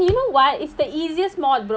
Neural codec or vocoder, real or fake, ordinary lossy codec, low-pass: none; real; none; none